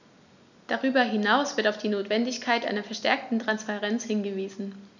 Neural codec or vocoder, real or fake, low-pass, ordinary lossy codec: none; real; 7.2 kHz; none